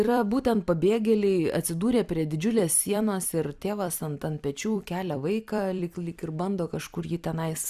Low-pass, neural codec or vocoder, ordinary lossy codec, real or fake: 14.4 kHz; vocoder, 44.1 kHz, 128 mel bands every 256 samples, BigVGAN v2; Opus, 64 kbps; fake